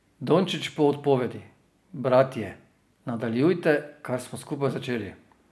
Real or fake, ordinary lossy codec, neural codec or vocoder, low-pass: real; none; none; none